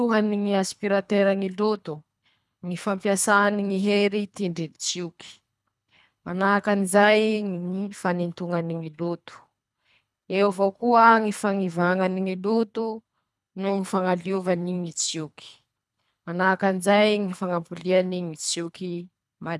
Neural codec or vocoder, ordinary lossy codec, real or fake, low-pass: codec, 24 kHz, 3 kbps, HILCodec; none; fake; none